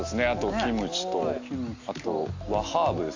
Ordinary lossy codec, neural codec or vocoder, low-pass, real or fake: AAC, 48 kbps; none; 7.2 kHz; real